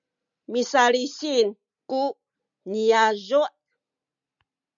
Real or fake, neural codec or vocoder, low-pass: real; none; 7.2 kHz